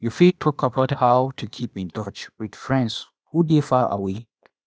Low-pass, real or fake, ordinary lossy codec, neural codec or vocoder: none; fake; none; codec, 16 kHz, 0.8 kbps, ZipCodec